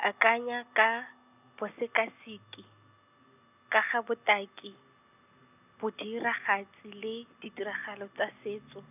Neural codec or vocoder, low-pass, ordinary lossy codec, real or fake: none; 3.6 kHz; none; real